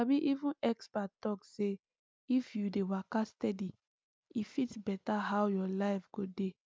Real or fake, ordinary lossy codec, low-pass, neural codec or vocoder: real; none; none; none